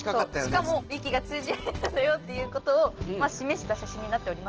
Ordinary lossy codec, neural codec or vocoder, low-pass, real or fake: Opus, 16 kbps; none; 7.2 kHz; real